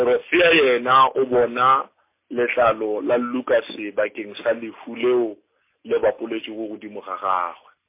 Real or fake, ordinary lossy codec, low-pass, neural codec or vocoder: real; MP3, 24 kbps; 3.6 kHz; none